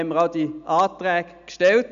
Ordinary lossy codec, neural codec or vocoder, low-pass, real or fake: none; none; 7.2 kHz; real